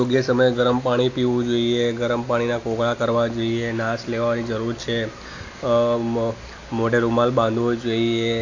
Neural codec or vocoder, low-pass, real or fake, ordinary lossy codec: none; 7.2 kHz; real; AAC, 48 kbps